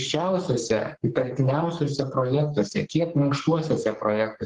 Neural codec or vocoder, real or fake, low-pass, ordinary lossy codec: codec, 44.1 kHz, 3.4 kbps, Pupu-Codec; fake; 10.8 kHz; Opus, 16 kbps